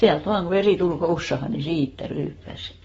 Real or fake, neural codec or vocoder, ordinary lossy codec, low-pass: fake; codec, 44.1 kHz, 7.8 kbps, Pupu-Codec; AAC, 24 kbps; 19.8 kHz